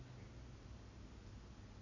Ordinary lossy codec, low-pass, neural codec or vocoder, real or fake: none; 7.2 kHz; none; real